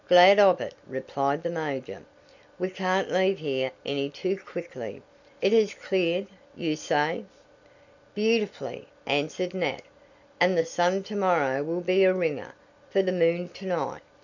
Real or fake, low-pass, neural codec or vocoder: real; 7.2 kHz; none